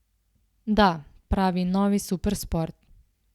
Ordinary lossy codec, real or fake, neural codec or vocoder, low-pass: Opus, 64 kbps; real; none; 19.8 kHz